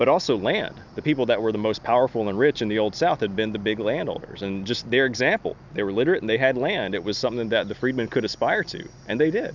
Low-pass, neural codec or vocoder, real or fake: 7.2 kHz; none; real